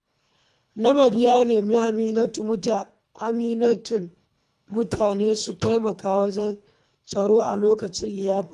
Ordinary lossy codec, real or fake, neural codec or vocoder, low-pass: none; fake; codec, 24 kHz, 1.5 kbps, HILCodec; none